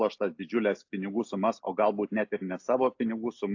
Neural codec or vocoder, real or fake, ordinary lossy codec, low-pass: none; real; AAC, 48 kbps; 7.2 kHz